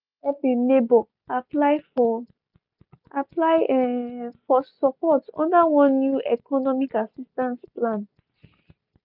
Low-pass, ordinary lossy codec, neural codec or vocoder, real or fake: 5.4 kHz; none; none; real